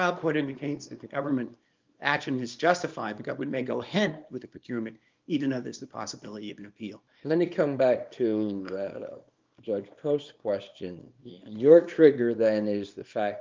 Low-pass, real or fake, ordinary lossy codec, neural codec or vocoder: 7.2 kHz; fake; Opus, 24 kbps; codec, 24 kHz, 0.9 kbps, WavTokenizer, small release